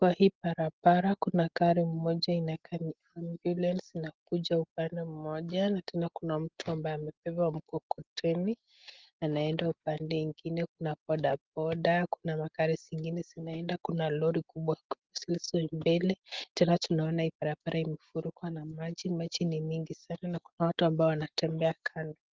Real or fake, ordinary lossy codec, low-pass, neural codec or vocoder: real; Opus, 16 kbps; 7.2 kHz; none